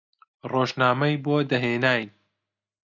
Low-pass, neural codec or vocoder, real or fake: 7.2 kHz; none; real